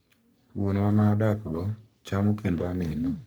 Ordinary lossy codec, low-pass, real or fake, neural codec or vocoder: none; none; fake; codec, 44.1 kHz, 3.4 kbps, Pupu-Codec